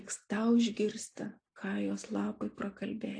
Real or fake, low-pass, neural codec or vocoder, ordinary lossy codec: real; 9.9 kHz; none; AAC, 48 kbps